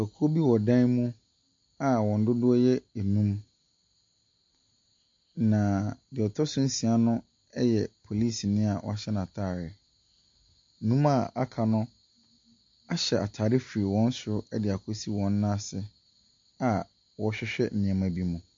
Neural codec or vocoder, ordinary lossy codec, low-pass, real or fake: none; MP3, 48 kbps; 7.2 kHz; real